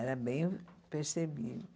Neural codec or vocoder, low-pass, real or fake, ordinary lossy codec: none; none; real; none